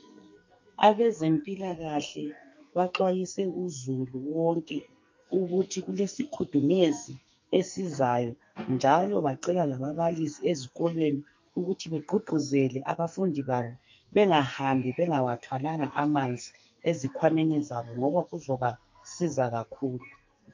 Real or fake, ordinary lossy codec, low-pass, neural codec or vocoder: fake; MP3, 48 kbps; 7.2 kHz; codec, 44.1 kHz, 2.6 kbps, SNAC